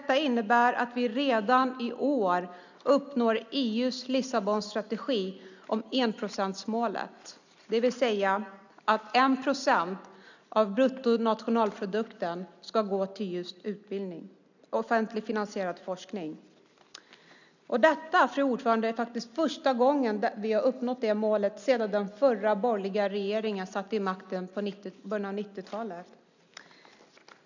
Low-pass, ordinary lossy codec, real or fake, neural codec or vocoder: 7.2 kHz; none; real; none